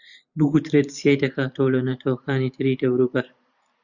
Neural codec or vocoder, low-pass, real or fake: vocoder, 44.1 kHz, 128 mel bands every 512 samples, BigVGAN v2; 7.2 kHz; fake